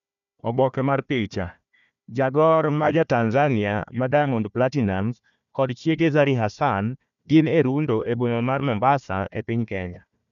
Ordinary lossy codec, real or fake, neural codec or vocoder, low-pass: none; fake; codec, 16 kHz, 1 kbps, FunCodec, trained on Chinese and English, 50 frames a second; 7.2 kHz